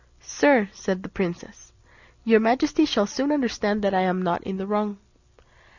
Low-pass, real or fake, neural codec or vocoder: 7.2 kHz; real; none